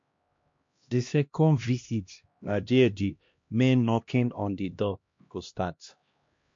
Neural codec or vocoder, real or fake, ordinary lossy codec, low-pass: codec, 16 kHz, 1 kbps, X-Codec, HuBERT features, trained on LibriSpeech; fake; MP3, 48 kbps; 7.2 kHz